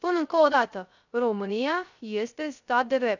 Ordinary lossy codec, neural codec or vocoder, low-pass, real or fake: none; codec, 16 kHz, 0.3 kbps, FocalCodec; 7.2 kHz; fake